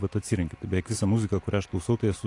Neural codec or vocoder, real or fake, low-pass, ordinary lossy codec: none; real; 10.8 kHz; AAC, 48 kbps